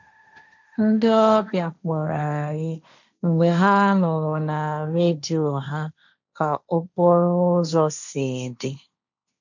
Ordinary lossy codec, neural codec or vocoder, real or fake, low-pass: none; codec, 16 kHz, 1.1 kbps, Voila-Tokenizer; fake; 7.2 kHz